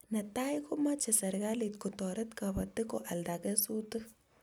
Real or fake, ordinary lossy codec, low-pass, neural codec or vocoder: real; none; none; none